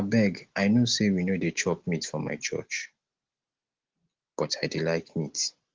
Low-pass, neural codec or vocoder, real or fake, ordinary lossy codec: 7.2 kHz; none; real; Opus, 16 kbps